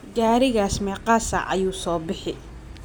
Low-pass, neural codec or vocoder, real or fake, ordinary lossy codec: none; none; real; none